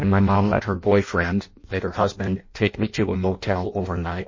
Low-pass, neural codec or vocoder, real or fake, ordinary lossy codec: 7.2 kHz; codec, 16 kHz in and 24 kHz out, 0.6 kbps, FireRedTTS-2 codec; fake; MP3, 32 kbps